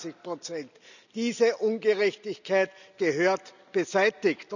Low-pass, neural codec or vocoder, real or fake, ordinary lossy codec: 7.2 kHz; none; real; none